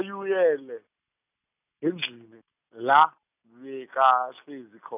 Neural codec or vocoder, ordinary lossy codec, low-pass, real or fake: none; none; 3.6 kHz; real